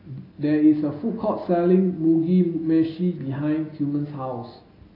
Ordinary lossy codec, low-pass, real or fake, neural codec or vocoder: AAC, 24 kbps; 5.4 kHz; real; none